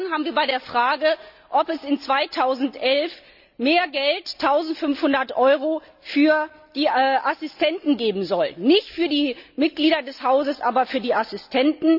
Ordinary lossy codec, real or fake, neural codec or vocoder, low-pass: none; real; none; 5.4 kHz